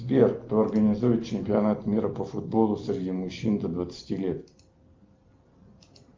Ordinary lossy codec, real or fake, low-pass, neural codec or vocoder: Opus, 24 kbps; real; 7.2 kHz; none